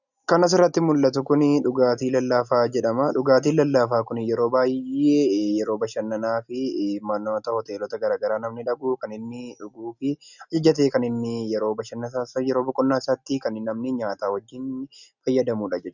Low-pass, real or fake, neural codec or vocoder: 7.2 kHz; real; none